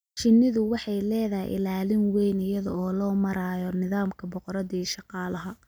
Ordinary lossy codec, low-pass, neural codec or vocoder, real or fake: none; none; none; real